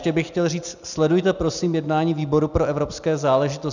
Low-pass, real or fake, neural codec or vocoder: 7.2 kHz; real; none